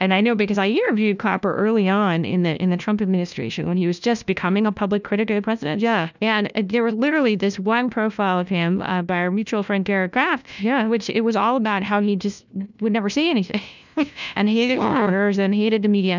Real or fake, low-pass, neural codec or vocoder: fake; 7.2 kHz; codec, 16 kHz, 1 kbps, FunCodec, trained on LibriTTS, 50 frames a second